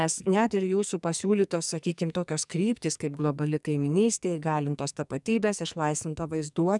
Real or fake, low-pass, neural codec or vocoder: fake; 10.8 kHz; codec, 44.1 kHz, 2.6 kbps, SNAC